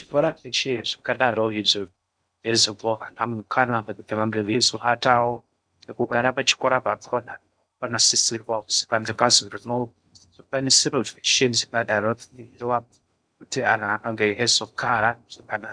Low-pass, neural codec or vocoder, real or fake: 9.9 kHz; codec, 16 kHz in and 24 kHz out, 0.6 kbps, FocalCodec, streaming, 4096 codes; fake